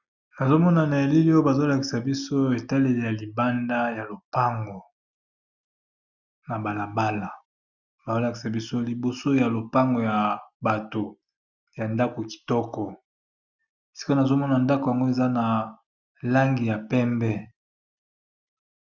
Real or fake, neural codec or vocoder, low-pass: real; none; 7.2 kHz